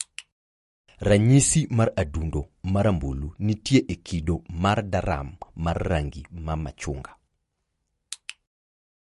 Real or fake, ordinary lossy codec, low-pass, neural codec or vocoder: real; MP3, 48 kbps; 14.4 kHz; none